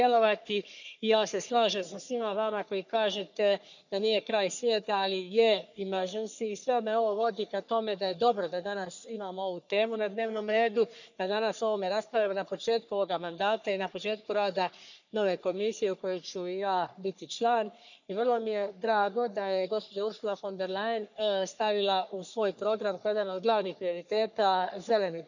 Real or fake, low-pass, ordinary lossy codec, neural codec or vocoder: fake; 7.2 kHz; none; codec, 44.1 kHz, 3.4 kbps, Pupu-Codec